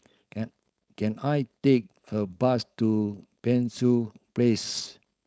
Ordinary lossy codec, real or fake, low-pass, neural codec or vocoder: none; fake; none; codec, 16 kHz, 4.8 kbps, FACodec